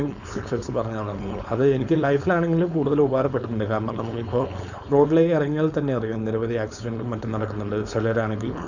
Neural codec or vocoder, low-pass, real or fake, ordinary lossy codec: codec, 16 kHz, 4.8 kbps, FACodec; 7.2 kHz; fake; none